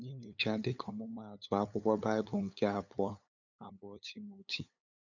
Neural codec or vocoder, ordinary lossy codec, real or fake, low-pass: codec, 16 kHz, 8 kbps, FunCodec, trained on LibriTTS, 25 frames a second; none; fake; 7.2 kHz